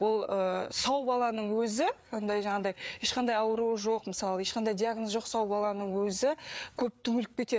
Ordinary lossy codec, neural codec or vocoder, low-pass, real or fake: none; codec, 16 kHz, 4 kbps, FreqCodec, larger model; none; fake